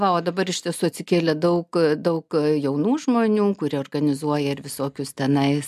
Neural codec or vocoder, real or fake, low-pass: none; real; 14.4 kHz